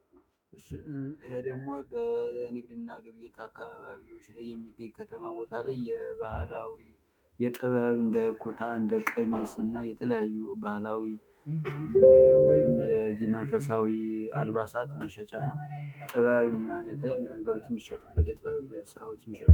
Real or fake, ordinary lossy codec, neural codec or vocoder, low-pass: fake; MP3, 96 kbps; autoencoder, 48 kHz, 32 numbers a frame, DAC-VAE, trained on Japanese speech; 19.8 kHz